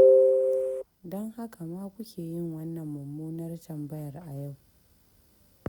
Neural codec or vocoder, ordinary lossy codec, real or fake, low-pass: none; none; real; 19.8 kHz